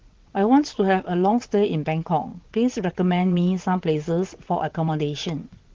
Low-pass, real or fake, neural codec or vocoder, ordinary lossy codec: 7.2 kHz; fake; vocoder, 22.05 kHz, 80 mel bands, WaveNeXt; Opus, 16 kbps